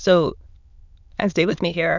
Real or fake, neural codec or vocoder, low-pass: fake; autoencoder, 22.05 kHz, a latent of 192 numbers a frame, VITS, trained on many speakers; 7.2 kHz